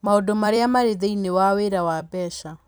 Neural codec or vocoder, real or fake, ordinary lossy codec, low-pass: vocoder, 44.1 kHz, 128 mel bands every 256 samples, BigVGAN v2; fake; none; none